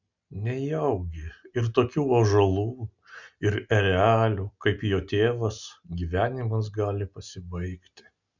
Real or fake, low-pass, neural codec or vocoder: real; 7.2 kHz; none